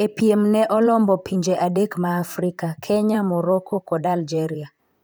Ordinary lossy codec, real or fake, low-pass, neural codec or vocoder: none; fake; none; vocoder, 44.1 kHz, 128 mel bands, Pupu-Vocoder